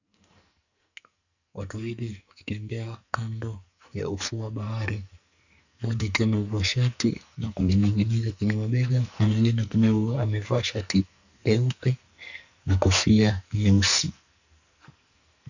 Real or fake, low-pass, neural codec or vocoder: fake; 7.2 kHz; codec, 44.1 kHz, 2.6 kbps, SNAC